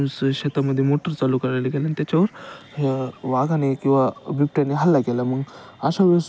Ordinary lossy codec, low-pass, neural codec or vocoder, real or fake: none; none; none; real